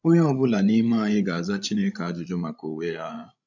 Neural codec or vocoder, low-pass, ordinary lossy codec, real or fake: codec, 16 kHz, 16 kbps, FreqCodec, larger model; 7.2 kHz; none; fake